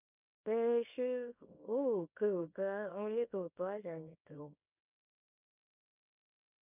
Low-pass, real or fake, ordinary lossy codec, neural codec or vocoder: 3.6 kHz; fake; none; codec, 24 kHz, 0.9 kbps, WavTokenizer, small release